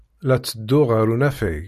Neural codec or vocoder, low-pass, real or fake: none; 14.4 kHz; real